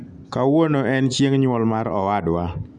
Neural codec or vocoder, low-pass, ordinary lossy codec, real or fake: none; 10.8 kHz; none; real